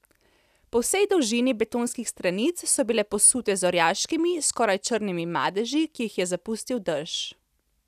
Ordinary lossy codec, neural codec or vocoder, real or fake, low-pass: none; none; real; 14.4 kHz